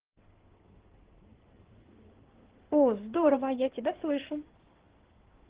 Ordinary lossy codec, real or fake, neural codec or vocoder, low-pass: Opus, 16 kbps; fake; codec, 16 kHz in and 24 kHz out, 2.2 kbps, FireRedTTS-2 codec; 3.6 kHz